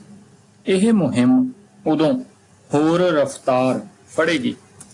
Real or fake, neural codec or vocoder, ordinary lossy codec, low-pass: real; none; AAC, 48 kbps; 10.8 kHz